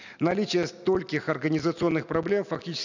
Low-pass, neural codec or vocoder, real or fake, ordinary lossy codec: 7.2 kHz; none; real; none